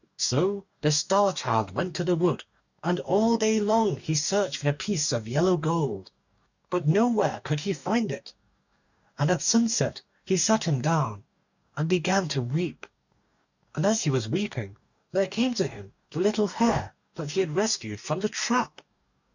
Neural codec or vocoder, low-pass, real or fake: codec, 44.1 kHz, 2.6 kbps, DAC; 7.2 kHz; fake